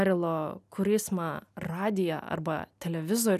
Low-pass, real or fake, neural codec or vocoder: 14.4 kHz; real; none